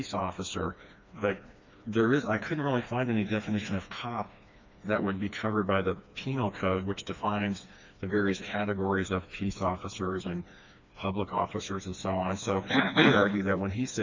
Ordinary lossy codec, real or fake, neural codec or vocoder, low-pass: MP3, 64 kbps; fake; codec, 16 kHz, 2 kbps, FreqCodec, smaller model; 7.2 kHz